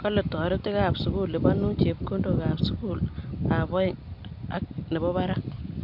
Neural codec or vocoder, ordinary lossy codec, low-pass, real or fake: none; MP3, 48 kbps; 5.4 kHz; real